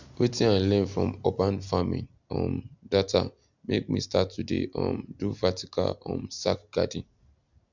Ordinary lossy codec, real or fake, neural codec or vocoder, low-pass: none; real; none; 7.2 kHz